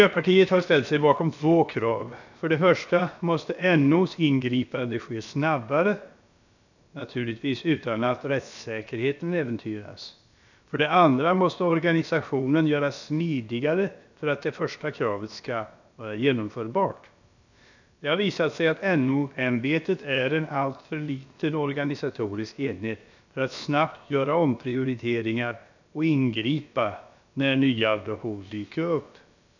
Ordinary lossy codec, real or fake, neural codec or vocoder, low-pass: none; fake; codec, 16 kHz, about 1 kbps, DyCAST, with the encoder's durations; 7.2 kHz